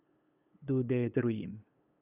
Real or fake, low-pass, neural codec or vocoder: fake; 3.6 kHz; codec, 16 kHz, 8 kbps, FunCodec, trained on LibriTTS, 25 frames a second